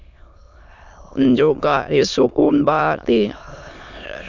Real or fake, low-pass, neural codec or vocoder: fake; 7.2 kHz; autoencoder, 22.05 kHz, a latent of 192 numbers a frame, VITS, trained on many speakers